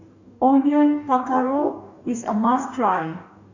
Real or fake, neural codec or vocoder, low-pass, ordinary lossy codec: fake; codec, 44.1 kHz, 2.6 kbps, DAC; 7.2 kHz; none